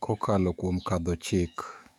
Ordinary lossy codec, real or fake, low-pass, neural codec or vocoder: none; fake; 19.8 kHz; autoencoder, 48 kHz, 128 numbers a frame, DAC-VAE, trained on Japanese speech